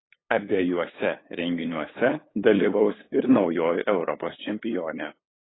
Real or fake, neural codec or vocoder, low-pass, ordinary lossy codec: fake; codec, 16 kHz, 8 kbps, FunCodec, trained on LibriTTS, 25 frames a second; 7.2 kHz; AAC, 16 kbps